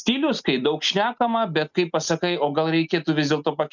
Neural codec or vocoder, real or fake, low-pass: none; real; 7.2 kHz